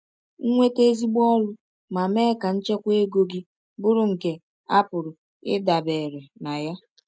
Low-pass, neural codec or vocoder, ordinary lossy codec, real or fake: none; none; none; real